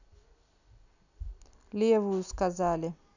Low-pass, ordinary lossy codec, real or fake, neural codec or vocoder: 7.2 kHz; none; real; none